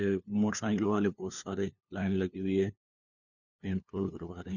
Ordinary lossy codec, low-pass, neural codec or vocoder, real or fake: none; 7.2 kHz; codec, 16 kHz, 2 kbps, FunCodec, trained on LibriTTS, 25 frames a second; fake